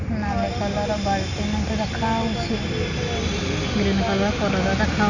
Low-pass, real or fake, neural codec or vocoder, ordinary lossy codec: 7.2 kHz; real; none; none